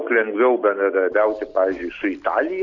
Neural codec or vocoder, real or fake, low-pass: none; real; 7.2 kHz